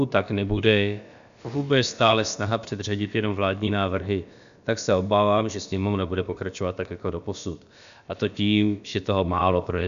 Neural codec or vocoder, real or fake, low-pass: codec, 16 kHz, about 1 kbps, DyCAST, with the encoder's durations; fake; 7.2 kHz